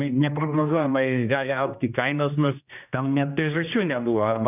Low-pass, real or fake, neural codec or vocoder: 3.6 kHz; fake; codec, 16 kHz, 1 kbps, X-Codec, HuBERT features, trained on general audio